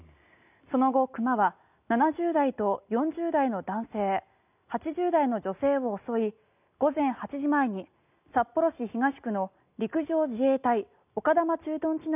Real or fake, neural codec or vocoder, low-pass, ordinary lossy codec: real; none; 3.6 kHz; none